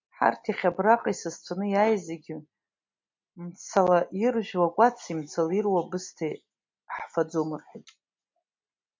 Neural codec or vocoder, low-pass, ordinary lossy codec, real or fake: none; 7.2 kHz; MP3, 64 kbps; real